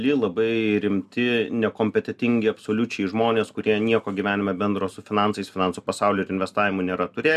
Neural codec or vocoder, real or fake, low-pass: none; real; 14.4 kHz